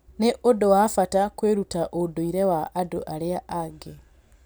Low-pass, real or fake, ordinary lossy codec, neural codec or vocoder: none; real; none; none